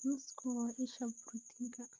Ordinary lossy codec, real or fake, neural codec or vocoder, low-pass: Opus, 32 kbps; real; none; 7.2 kHz